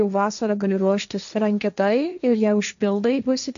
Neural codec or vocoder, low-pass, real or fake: codec, 16 kHz, 1.1 kbps, Voila-Tokenizer; 7.2 kHz; fake